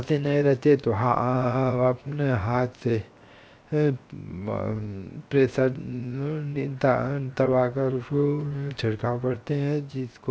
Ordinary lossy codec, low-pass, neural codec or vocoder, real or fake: none; none; codec, 16 kHz, 0.7 kbps, FocalCodec; fake